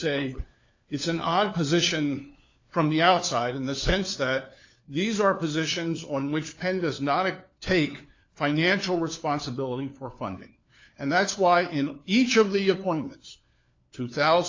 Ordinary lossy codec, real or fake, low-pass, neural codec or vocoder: AAC, 48 kbps; fake; 7.2 kHz; codec, 16 kHz, 4 kbps, FunCodec, trained on LibriTTS, 50 frames a second